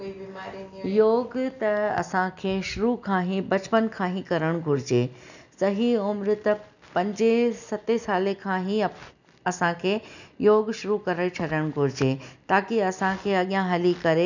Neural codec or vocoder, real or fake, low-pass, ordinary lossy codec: none; real; 7.2 kHz; none